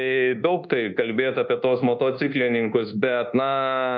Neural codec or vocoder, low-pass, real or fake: codec, 24 kHz, 1.2 kbps, DualCodec; 7.2 kHz; fake